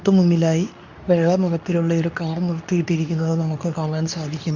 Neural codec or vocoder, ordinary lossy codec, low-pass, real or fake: codec, 24 kHz, 0.9 kbps, WavTokenizer, medium speech release version 2; none; 7.2 kHz; fake